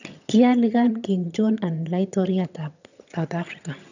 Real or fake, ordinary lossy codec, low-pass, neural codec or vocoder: fake; none; 7.2 kHz; vocoder, 22.05 kHz, 80 mel bands, HiFi-GAN